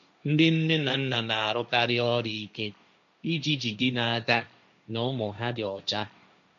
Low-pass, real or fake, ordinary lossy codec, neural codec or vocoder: 7.2 kHz; fake; none; codec, 16 kHz, 1.1 kbps, Voila-Tokenizer